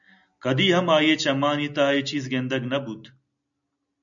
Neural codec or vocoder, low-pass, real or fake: none; 7.2 kHz; real